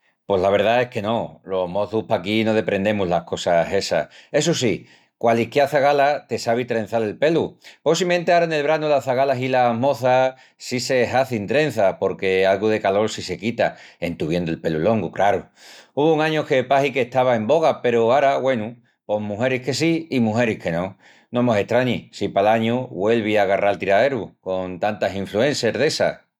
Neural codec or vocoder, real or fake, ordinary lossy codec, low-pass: none; real; none; 19.8 kHz